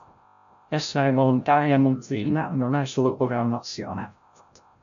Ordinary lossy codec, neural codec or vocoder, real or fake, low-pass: MP3, 96 kbps; codec, 16 kHz, 0.5 kbps, FreqCodec, larger model; fake; 7.2 kHz